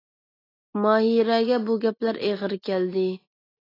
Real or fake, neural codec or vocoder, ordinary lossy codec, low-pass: real; none; AAC, 24 kbps; 5.4 kHz